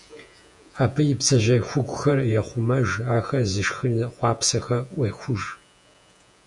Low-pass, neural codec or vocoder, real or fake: 10.8 kHz; vocoder, 48 kHz, 128 mel bands, Vocos; fake